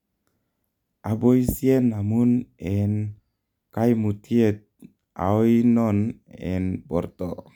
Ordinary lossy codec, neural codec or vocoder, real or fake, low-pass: none; none; real; 19.8 kHz